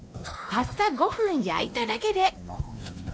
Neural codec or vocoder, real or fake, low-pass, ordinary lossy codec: codec, 16 kHz, 1 kbps, X-Codec, WavLM features, trained on Multilingual LibriSpeech; fake; none; none